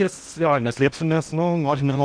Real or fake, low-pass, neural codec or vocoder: fake; 9.9 kHz; codec, 16 kHz in and 24 kHz out, 0.8 kbps, FocalCodec, streaming, 65536 codes